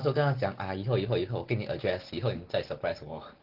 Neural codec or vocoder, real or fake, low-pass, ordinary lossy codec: none; real; 5.4 kHz; Opus, 16 kbps